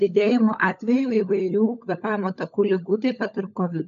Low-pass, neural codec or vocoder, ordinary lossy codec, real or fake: 7.2 kHz; codec, 16 kHz, 16 kbps, FunCodec, trained on LibriTTS, 50 frames a second; MP3, 64 kbps; fake